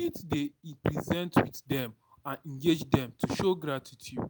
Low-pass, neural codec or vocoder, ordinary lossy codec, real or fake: none; none; none; real